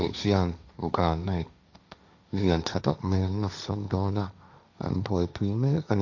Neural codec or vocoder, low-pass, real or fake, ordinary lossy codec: codec, 16 kHz, 1.1 kbps, Voila-Tokenizer; 7.2 kHz; fake; none